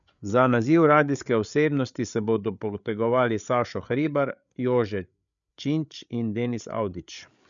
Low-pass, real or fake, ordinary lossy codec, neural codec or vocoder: 7.2 kHz; fake; none; codec, 16 kHz, 8 kbps, FreqCodec, larger model